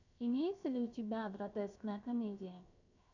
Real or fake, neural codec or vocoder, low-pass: fake; codec, 16 kHz, 0.7 kbps, FocalCodec; 7.2 kHz